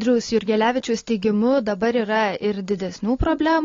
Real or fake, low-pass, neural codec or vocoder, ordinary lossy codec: real; 7.2 kHz; none; AAC, 32 kbps